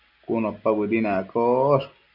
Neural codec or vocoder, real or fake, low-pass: none; real; 5.4 kHz